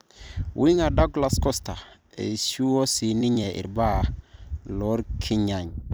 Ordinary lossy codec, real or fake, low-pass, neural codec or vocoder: none; fake; none; vocoder, 44.1 kHz, 128 mel bands every 256 samples, BigVGAN v2